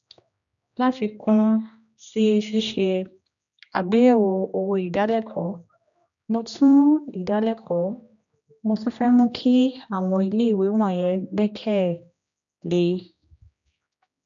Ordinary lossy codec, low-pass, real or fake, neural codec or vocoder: none; 7.2 kHz; fake; codec, 16 kHz, 1 kbps, X-Codec, HuBERT features, trained on general audio